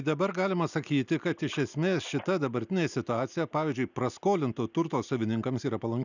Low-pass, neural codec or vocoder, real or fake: 7.2 kHz; none; real